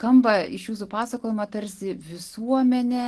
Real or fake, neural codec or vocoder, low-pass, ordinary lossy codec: real; none; 10.8 kHz; Opus, 16 kbps